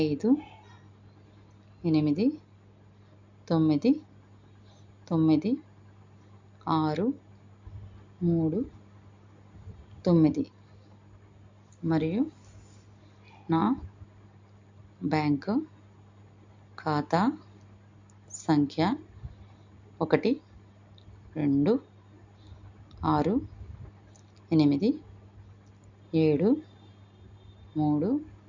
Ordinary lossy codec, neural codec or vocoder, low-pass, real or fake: MP3, 64 kbps; none; 7.2 kHz; real